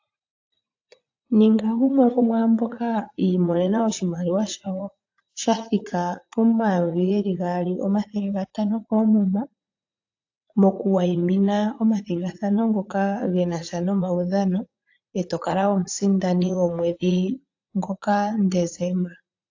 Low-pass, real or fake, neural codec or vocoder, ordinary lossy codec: 7.2 kHz; fake; vocoder, 44.1 kHz, 80 mel bands, Vocos; AAC, 48 kbps